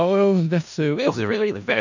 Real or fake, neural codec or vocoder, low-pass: fake; codec, 16 kHz in and 24 kHz out, 0.4 kbps, LongCat-Audio-Codec, four codebook decoder; 7.2 kHz